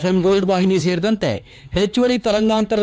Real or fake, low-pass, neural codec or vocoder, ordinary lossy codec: fake; none; codec, 16 kHz, 4 kbps, X-Codec, HuBERT features, trained on LibriSpeech; none